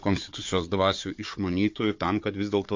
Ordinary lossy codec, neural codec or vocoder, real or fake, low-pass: MP3, 48 kbps; codec, 16 kHz, 4 kbps, FunCodec, trained on Chinese and English, 50 frames a second; fake; 7.2 kHz